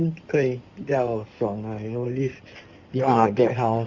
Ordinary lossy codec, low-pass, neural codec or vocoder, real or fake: Opus, 64 kbps; 7.2 kHz; codec, 16 kHz in and 24 kHz out, 2.2 kbps, FireRedTTS-2 codec; fake